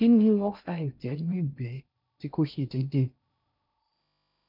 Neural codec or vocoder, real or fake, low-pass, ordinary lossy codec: codec, 16 kHz in and 24 kHz out, 0.6 kbps, FocalCodec, streaming, 2048 codes; fake; 5.4 kHz; MP3, 48 kbps